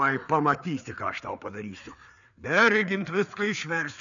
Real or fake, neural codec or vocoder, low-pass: fake; codec, 16 kHz, 4 kbps, FreqCodec, larger model; 7.2 kHz